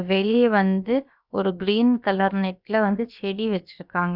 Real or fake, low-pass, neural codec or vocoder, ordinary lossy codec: fake; 5.4 kHz; codec, 16 kHz, about 1 kbps, DyCAST, with the encoder's durations; none